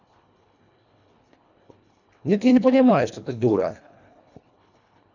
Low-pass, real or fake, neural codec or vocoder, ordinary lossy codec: 7.2 kHz; fake; codec, 24 kHz, 1.5 kbps, HILCodec; none